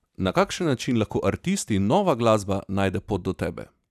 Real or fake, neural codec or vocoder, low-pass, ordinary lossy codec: real; none; 14.4 kHz; none